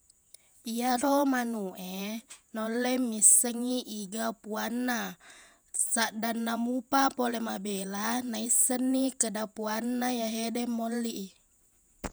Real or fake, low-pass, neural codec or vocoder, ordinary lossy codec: fake; none; vocoder, 48 kHz, 128 mel bands, Vocos; none